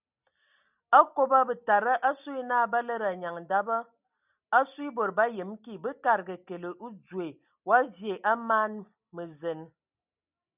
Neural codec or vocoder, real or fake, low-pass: none; real; 3.6 kHz